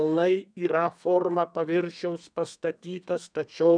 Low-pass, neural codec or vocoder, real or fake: 9.9 kHz; codec, 32 kHz, 1.9 kbps, SNAC; fake